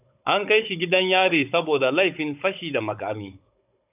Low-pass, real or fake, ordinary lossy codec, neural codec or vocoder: 3.6 kHz; fake; AAC, 32 kbps; codec, 16 kHz, 16 kbps, FunCodec, trained on Chinese and English, 50 frames a second